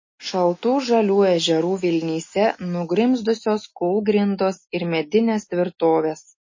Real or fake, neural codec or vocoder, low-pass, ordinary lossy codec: real; none; 7.2 kHz; MP3, 32 kbps